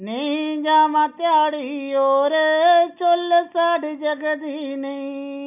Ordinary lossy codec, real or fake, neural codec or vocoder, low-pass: none; real; none; 3.6 kHz